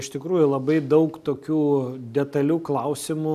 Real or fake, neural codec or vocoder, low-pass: real; none; 14.4 kHz